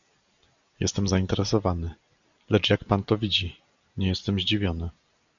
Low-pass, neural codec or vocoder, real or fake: 7.2 kHz; none; real